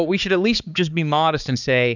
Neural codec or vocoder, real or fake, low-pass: codec, 16 kHz, 4 kbps, X-Codec, HuBERT features, trained on balanced general audio; fake; 7.2 kHz